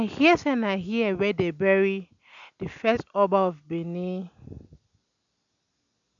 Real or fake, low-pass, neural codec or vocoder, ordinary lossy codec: real; 7.2 kHz; none; none